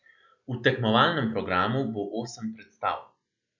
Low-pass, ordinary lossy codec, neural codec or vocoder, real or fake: 7.2 kHz; none; none; real